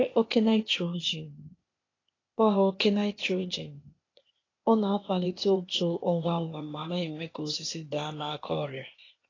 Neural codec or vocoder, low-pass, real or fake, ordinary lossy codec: codec, 16 kHz, 0.8 kbps, ZipCodec; 7.2 kHz; fake; AAC, 32 kbps